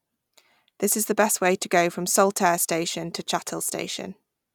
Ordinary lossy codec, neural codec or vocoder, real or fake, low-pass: none; none; real; none